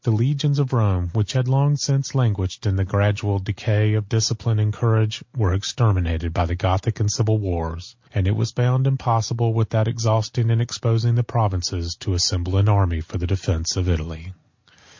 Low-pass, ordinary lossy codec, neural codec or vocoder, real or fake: 7.2 kHz; MP3, 48 kbps; none; real